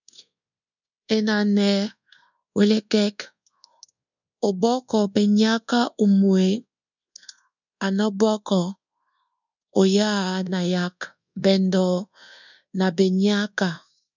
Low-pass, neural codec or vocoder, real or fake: 7.2 kHz; codec, 24 kHz, 1.2 kbps, DualCodec; fake